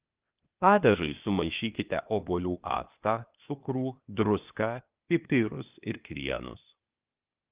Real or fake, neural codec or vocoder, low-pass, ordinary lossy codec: fake; codec, 16 kHz, 0.8 kbps, ZipCodec; 3.6 kHz; Opus, 24 kbps